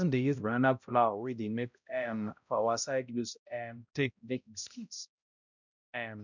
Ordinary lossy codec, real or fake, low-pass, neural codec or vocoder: none; fake; 7.2 kHz; codec, 16 kHz, 0.5 kbps, X-Codec, HuBERT features, trained on balanced general audio